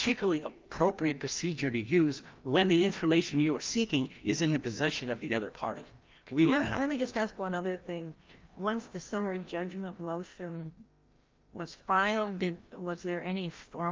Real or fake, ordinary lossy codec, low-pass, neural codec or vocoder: fake; Opus, 24 kbps; 7.2 kHz; codec, 16 kHz, 1 kbps, FreqCodec, larger model